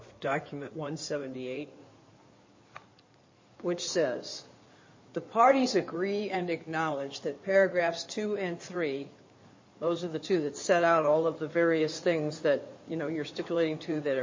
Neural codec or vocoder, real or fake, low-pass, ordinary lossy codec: codec, 16 kHz in and 24 kHz out, 2.2 kbps, FireRedTTS-2 codec; fake; 7.2 kHz; MP3, 32 kbps